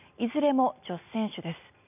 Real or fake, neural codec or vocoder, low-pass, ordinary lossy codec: real; none; 3.6 kHz; none